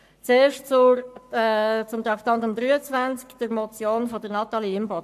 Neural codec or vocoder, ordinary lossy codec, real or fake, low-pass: codec, 44.1 kHz, 7.8 kbps, Pupu-Codec; AAC, 96 kbps; fake; 14.4 kHz